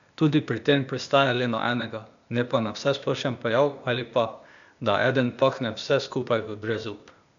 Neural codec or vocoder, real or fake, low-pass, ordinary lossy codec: codec, 16 kHz, 0.8 kbps, ZipCodec; fake; 7.2 kHz; none